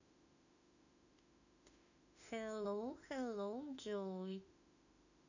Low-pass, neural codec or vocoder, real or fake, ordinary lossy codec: 7.2 kHz; autoencoder, 48 kHz, 32 numbers a frame, DAC-VAE, trained on Japanese speech; fake; none